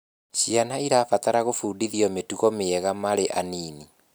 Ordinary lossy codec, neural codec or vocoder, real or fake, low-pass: none; none; real; none